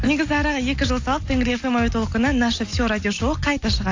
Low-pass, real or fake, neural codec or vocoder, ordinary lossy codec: 7.2 kHz; real; none; AAC, 48 kbps